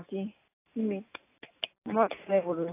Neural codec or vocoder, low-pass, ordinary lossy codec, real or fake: none; 3.6 kHz; AAC, 24 kbps; real